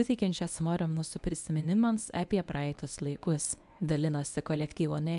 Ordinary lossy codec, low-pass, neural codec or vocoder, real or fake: AAC, 96 kbps; 10.8 kHz; codec, 24 kHz, 0.9 kbps, WavTokenizer, medium speech release version 1; fake